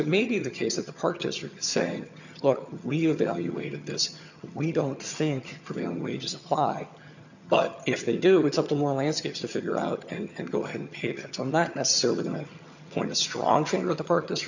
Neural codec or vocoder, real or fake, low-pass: vocoder, 22.05 kHz, 80 mel bands, HiFi-GAN; fake; 7.2 kHz